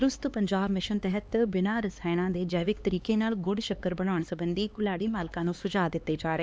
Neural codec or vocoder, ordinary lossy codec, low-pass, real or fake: codec, 16 kHz, 2 kbps, X-Codec, HuBERT features, trained on LibriSpeech; none; none; fake